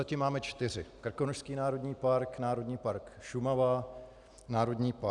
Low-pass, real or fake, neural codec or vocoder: 10.8 kHz; real; none